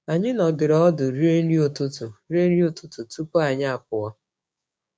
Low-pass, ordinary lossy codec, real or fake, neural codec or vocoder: none; none; fake; codec, 16 kHz, 6 kbps, DAC